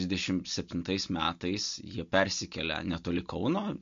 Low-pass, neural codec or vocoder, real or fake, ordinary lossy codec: 7.2 kHz; none; real; MP3, 48 kbps